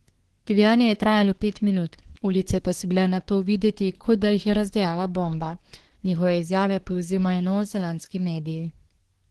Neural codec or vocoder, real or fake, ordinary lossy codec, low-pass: codec, 24 kHz, 1 kbps, SNAC; fake; Opus, 16 kbps; 10.8 kHz